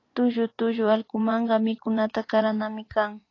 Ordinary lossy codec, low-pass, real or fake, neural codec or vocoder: AAC, 32 kbps; 7.2 kHz; fake; vocoder, 24 kHz, 100 mel bands, Vocos